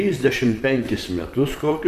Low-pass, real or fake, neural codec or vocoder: 14.4 kHz; fake; vocoder, 44.1 kHz, 128 mel bands, Pupu-Vocoder